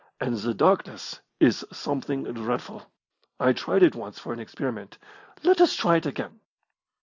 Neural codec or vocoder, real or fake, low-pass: none; real; 7.2 kHz